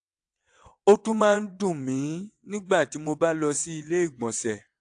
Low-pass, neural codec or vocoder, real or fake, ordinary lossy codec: 9.9 kHz; vocoder, 22.05 kHz, 80 mel bands, WaveNeXt; fake; AAC, 64 kbps